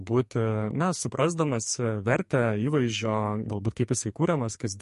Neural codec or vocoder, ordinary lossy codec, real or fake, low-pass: codec, 44.1 kHz, 2.6 kbps, SNAC; MP3, 48 kbps; fake; 14.4 kHz